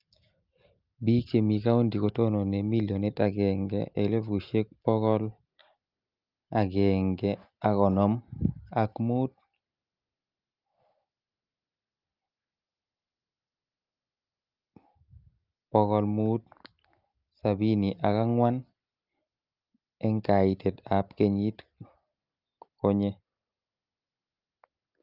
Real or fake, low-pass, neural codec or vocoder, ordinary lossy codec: real; 5.4 kHz; none; Opus, 24 kbps